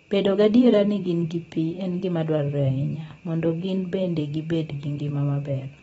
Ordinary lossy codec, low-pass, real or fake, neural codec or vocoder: AAC, 24 kbps; 19.8 kHz; fake; vocoder, 44.1 kHz, 128 mel bands every 512 samples, BigVGAN v2